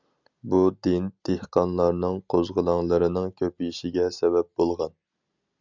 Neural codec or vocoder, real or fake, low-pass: none; real; 7.2 kHz